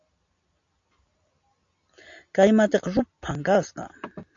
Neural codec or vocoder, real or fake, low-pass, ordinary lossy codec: none; real; 7.2 kHz; AAC, 64 kbps